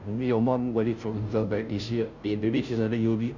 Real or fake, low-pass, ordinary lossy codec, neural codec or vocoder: fake; 7.2 kHz; none; codec, 16 kHz, 0.5 kbps, FunCodec, trained on Chinese and English, 25 frames a second